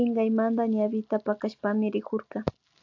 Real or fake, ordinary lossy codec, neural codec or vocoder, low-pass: real; AAC, 48 kbps; none; 7.2 kHz